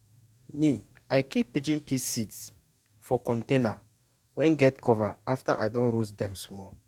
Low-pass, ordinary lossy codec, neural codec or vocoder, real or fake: 19.8 kHz; none; codec, 44.1 kHz, 2.6 kbps, DAC; fake